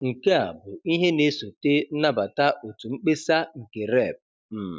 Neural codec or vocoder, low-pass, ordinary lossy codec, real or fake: none; none; none; real